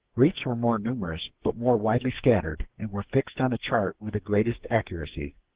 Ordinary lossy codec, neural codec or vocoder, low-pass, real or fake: Opus, 16 kbps; codec, 44.1 kHz, 2.6 kbps, SNAC; 3.6 kHz; fake